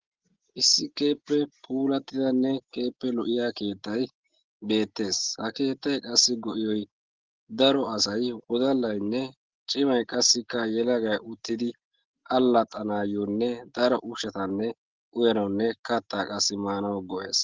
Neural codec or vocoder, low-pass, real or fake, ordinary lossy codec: none; 7.2 kHz; real; Opus, 16 kbps